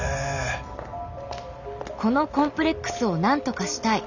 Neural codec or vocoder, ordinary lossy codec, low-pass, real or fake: none; none; 7.2 kHz; real